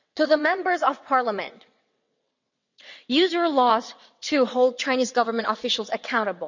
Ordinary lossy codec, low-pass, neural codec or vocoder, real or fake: none; 7.2 kHz; vocoder, 22.05 kHz, 80 mel bands, WaveNeXt; fake